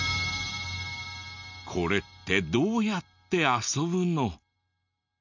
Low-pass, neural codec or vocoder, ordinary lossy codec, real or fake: 7.2 kHz; none; none; real